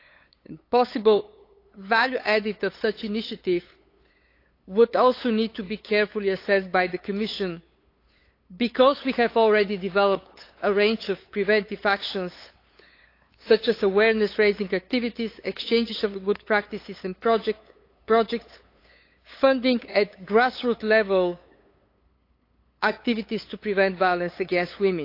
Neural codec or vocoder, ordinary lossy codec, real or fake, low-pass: codec, 16 kHz, 8 kbps, FunCodec, trained on LibriTTS, 25 frames a second; AAC, 32 kbps; fake; 5.4 kHz